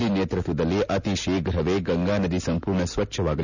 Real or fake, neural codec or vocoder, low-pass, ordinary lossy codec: real; none; 7.2 kHz; none